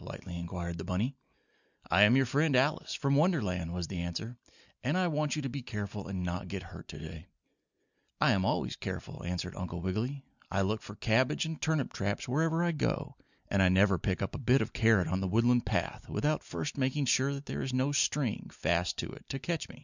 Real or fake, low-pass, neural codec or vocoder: real; 7.2 kHz; none